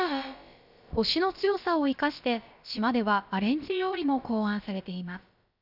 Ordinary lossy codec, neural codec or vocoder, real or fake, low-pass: none; codec, 16 kHz, about 1 kbps, DyCAST, with the encoder's durations; fake; 5.4 kHz